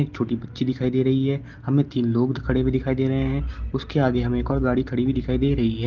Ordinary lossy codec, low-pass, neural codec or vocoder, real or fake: Opus, 16 kbps; 7.2 kHz; none; real